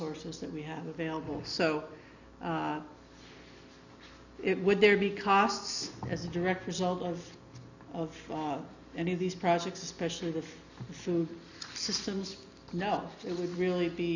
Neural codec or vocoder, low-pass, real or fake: none; 7.2 kHz; real